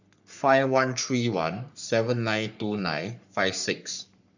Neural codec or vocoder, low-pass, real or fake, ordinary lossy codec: codec, 44.1 kHz, 3.4 kbps, Pupu-Codec; 7.2 kHz; fake; none